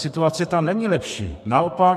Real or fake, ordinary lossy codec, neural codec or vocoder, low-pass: fake; MP3, 96 kbps; codec, 32 kHz, 1.9 kbps, SNAC; 14.4 kHz